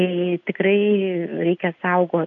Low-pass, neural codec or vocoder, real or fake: 7.2 kHz; none; real